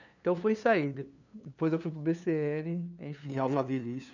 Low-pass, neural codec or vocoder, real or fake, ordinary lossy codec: 7.2 kHz; codec, 16 kHz, 2 kbps, FunCodec, trained on LibriTTS, 25 frames a second; fake; none